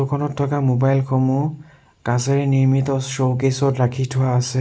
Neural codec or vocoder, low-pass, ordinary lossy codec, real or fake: none; none; none; real